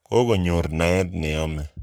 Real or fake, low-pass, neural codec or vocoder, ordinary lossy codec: fake; none; codec, 44.1 kHz, 7.8 kbps, Pupu-Codec; none